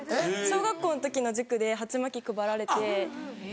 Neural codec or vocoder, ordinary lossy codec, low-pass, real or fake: none; none; none; real